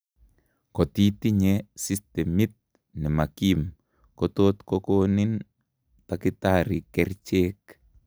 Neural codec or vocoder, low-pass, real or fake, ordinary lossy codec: none; none; real; none